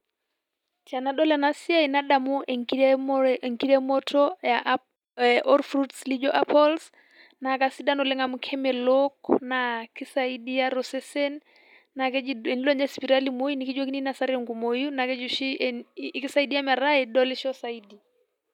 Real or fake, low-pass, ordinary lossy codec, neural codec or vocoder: real; 19.8 kHz; none; none